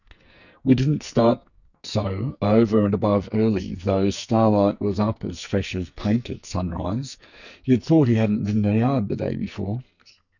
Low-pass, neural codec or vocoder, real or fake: 7.2 kHz; codec, 44.1 kHz, 2.6 kbps, SNAC; fake